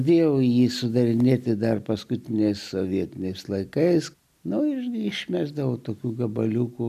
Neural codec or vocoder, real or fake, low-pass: none; real; 14.4 kHz